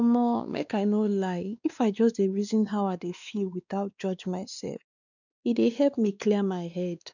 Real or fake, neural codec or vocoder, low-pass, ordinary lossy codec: fake; codec, 16 kHz, 2 kbps, X-Codec, WavLM features, trained on Multilingual LibriSpeech; 7.2 kHz; none